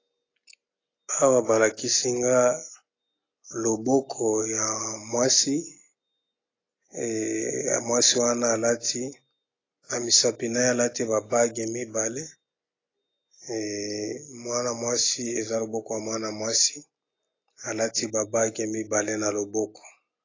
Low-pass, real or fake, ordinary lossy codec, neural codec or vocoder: 7.2 kHz; real; AAC, 32 kbps; none